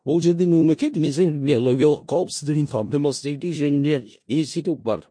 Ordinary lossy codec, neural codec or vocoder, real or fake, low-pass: MP3, 48 kbps; codec, 16 kHz in and 24 kHz out, 0.4 kbps, LongCat-Audio-Codec, four codebook decoder; fake; 9.9 kHz